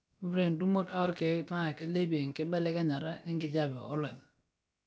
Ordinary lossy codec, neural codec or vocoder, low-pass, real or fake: none; codec, 16 kHz, about 1 kbps, DyCAST, with the encoder's durations; none; fake